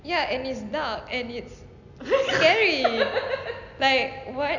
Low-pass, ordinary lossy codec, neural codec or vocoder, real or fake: 7.2 kHz; none; none; real